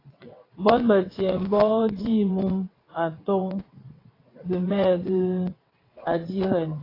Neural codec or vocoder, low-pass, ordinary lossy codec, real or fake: vocoder, 22.05 kHz, 80 mel bands, WaveNeXt; 5.4 kHz; AAC, 24 kbps; fake